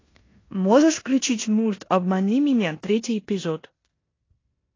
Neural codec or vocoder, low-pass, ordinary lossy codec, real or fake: codec, 16 kHz in and 24 kHz out, 0.9 kbps, LongCat-Audio-Codec, four codebook decoder; 7.2 kHz; AAC, 32 kbps; fake